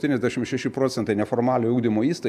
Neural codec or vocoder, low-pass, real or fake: none; 14.4 kHz; real